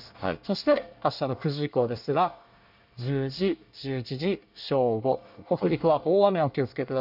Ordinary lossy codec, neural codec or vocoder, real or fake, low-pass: none; codec, 24 kHz, 1 kbps, SNAC; fake; 5.4 kHz